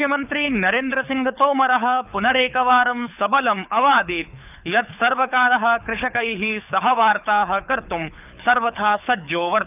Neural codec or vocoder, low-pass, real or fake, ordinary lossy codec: codec, 24 kHz, 6 kbps, HILCodec; 3.6 kHz; fake; none